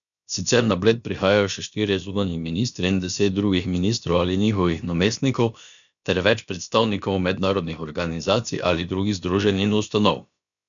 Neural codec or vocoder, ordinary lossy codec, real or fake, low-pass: codec, 16 kHz, about 1 kbps, DyCAST, with the encoder's durations; none; fake; 7.2 kHz